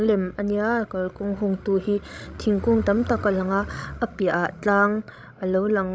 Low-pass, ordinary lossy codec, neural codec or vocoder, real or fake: none; none; codec, 16 kHz, 16 kbps, FreqCodec, larger model; fake